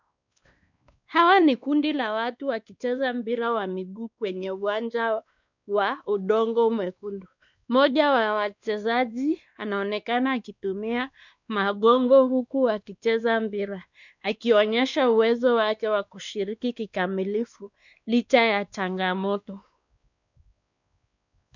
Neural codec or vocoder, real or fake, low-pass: codec, 16 kHz, 2 kbps, X-Codec, WavLM features, trained on Multilingual LibriSpeech; fake; 7.2 kHz